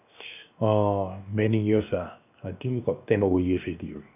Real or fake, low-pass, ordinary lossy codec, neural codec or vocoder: fake; 3.6 kHz; none; codec, 16 kHz, 0.7 kbps, FocalCodec